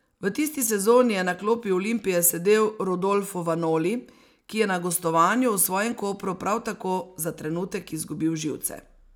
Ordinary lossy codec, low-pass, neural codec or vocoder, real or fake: none; none; none; real